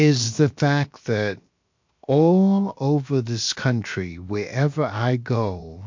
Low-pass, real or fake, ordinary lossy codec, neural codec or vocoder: 7.2 kHz; fake; MP3, 48 kbps; codec, 16 kHz, 0.7 kbps, FocalCodec